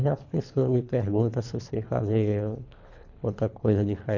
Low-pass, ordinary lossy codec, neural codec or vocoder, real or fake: 7.2 kHz; none; codec, 24 kHz, 3 kbps, HILCodec; fake